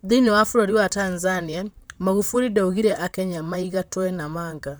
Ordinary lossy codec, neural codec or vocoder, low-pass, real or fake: none; vocoder, 44.1 kHz, 128 mel bands, Pupu-Vocoder; none; fake